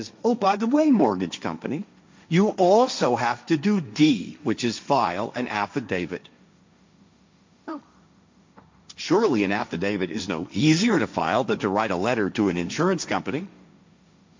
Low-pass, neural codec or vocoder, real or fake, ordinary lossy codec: 7.2 kHz; codec, 16 kHz, 1.1 kbps, Voila-Tokenizer; fake; MP3, 64 kbps